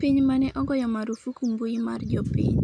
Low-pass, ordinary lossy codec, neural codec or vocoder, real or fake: 9.9 kHz; none; none; real